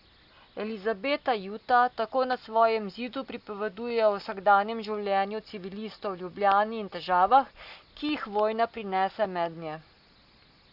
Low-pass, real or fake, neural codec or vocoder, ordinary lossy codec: 5.4 kHz; real; none; Opus, 64 kbps